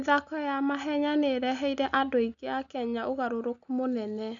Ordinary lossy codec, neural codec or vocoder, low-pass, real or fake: none; none; 7.2 kHz; real